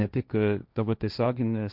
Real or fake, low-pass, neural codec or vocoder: fake; 5.4 kHz; codec, 16 kHz, 1.1 kbps, Voila-Tokenizer